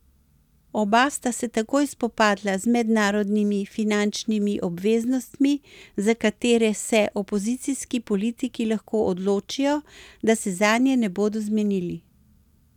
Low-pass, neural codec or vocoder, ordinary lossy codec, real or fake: 19.8 kHz; none; none; real